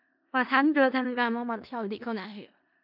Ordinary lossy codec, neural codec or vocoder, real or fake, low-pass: MP3, 48 kbps; codec, 16 kHz in and 24 kHz out, 0.4 kbps, LongCat-Audio-Codec, four codebook decoder; fake; 5.4 kHz